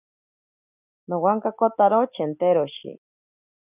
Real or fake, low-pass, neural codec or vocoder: real; 3.6 kHz; none